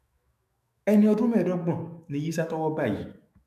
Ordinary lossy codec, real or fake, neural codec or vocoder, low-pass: none; fake; autoencoder, 48 kHz, 128 numbers a frame, DAC-VAE, trained on Japanese speech; 14.4 kHz